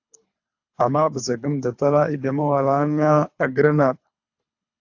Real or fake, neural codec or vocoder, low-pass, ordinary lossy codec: fake; codec, 24 kHz, 3 kbps, HILCodec; 7.2 kHz; AAC, 48 kbps